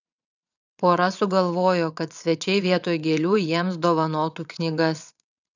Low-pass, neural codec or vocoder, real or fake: 7.2 kHz; vocoder, 22.05 kHz, 80 mel bands, WaveNeXt; fake